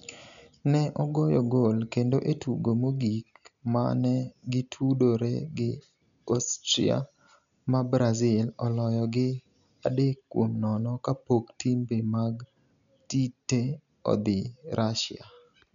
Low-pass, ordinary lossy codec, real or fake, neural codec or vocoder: 7.2 kHz; none; real; none